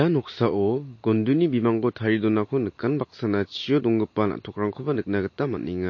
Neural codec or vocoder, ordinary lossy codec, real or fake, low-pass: none; MP3, 32 kbps; real; 7.2 kHz